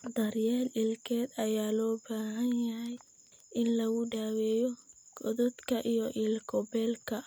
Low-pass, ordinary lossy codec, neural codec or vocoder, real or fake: none; none; none; real